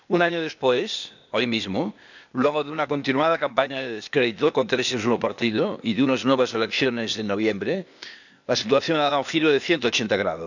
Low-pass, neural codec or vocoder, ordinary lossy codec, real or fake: 7.2 kHz; codec, 16 kHz, 0.8 kbps, ZipCodec; none; fake